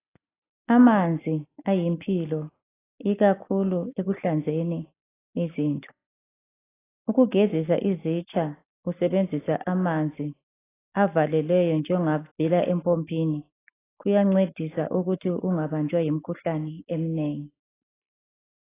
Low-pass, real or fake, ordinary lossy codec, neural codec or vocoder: 3.6 kHz; real; AAC, 16 kbps; none